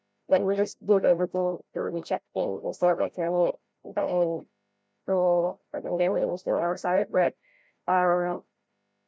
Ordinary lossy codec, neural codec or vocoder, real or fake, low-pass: none; codec, 16 kHz, 0.5 kbps, FreqCodec, larger model; fake; none